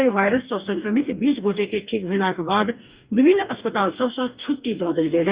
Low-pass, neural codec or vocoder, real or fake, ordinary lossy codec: 3.6 kHz; codec, 44.1 kHz, 2.6 kbps, DAC; fake; Opus, 64 kbps